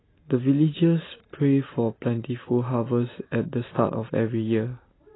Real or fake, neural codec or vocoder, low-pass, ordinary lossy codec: real; none; 7.2 kHz; AAC, 16 kbps